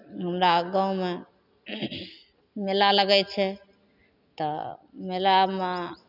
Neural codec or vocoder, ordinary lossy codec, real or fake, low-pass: none; none; real; 5.4 kHz